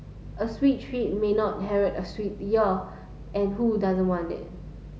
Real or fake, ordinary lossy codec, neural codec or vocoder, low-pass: real; none; none; none